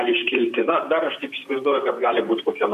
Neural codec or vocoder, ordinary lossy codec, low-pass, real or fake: vocoder, 44.1 kHz, 128 mel bands, Pupu-Vocoder; MP3, 64 kbps; 14.4 kHz; fake